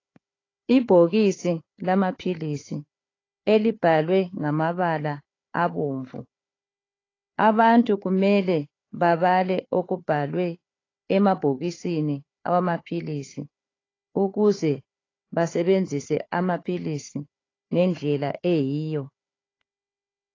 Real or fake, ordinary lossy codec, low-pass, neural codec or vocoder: fake; AAC, 32 kbps; 7.2 kHz; codec, 16 kHz, 4 kbps, FunCodec, trained on Chinese and English, 50 frames a second